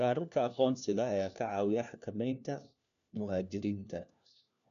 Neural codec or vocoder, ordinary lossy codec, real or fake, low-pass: codec, 16 kHz, 1 kbps, FunCodec, trained on LibriTTS, 50 frames a second; MP3, 96 kbps; fake; 7.2 kHz